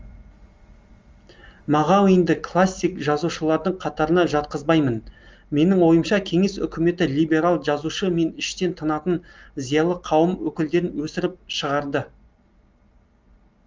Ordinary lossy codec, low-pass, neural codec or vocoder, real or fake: Opus, 32 kbps; 7.2 kHz; none; real